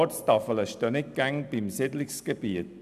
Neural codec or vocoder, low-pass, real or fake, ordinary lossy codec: none; 14.4 kHz; real; none